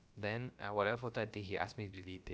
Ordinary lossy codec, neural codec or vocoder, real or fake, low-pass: none; codec, 16 kHz, about 1 kbps, DyCAST, with the encoder's durations; fake; none